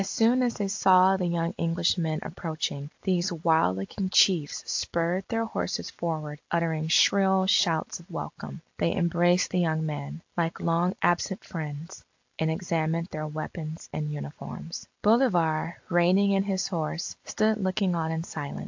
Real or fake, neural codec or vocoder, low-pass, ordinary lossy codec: real; none; 7.2 kHz; AAC, 48 kbps